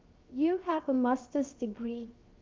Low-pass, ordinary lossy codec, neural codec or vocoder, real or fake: 7.2 kHz; Opus, 24 kbps; codec, 16 kHz, 0.7 kbps, FocalCodec; fake